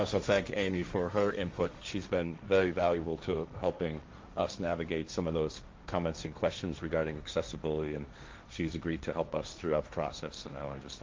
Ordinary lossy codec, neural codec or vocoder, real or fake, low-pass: Opus, 32 kbps; codec, 16 kHz, 1.1 kbps, Voila-Tokenizer; fake; 7.2 kHz